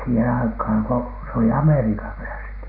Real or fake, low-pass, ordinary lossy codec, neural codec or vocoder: real; 5.4 kHz; none; none